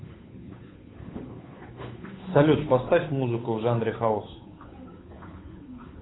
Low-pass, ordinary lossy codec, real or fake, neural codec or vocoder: 7.2 kHz; AAC, 16 kbps; fake; codec, 16 kHz, 8 kbps, FunCodec, trained on Chinese and English, 25 frames a second